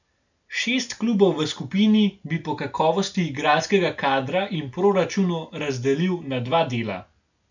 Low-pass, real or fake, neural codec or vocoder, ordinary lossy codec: 7.2 kHz; real; none; none